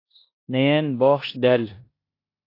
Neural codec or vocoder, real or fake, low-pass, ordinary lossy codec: codec, 16 kHz in and 24 kHz out, 0.9 kbps, LongCat-Audio-Codec, four codebook decoder; fake; 5.4 kHz; AAC, 32 kbps